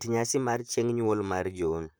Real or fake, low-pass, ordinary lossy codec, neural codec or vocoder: fake; none; none; vocoder, 44.1 kHz, 128 mel bands, Pupu-Vocoder